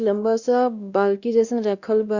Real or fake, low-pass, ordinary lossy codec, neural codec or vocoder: fake; 7.2 kHz; Opus, 64 kbps; codec, 16 kHz, 1 kbps, X-Codec, WavLM features, trained on Multilingual LibriSpeech